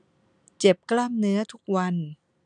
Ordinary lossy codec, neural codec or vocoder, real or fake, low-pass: none; none; real; 9.9 kHz